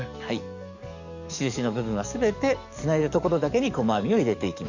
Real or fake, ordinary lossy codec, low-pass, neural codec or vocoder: fake; none; 7.2 kHz; codec, 44.1 kHz, 7.8 kbps, DAC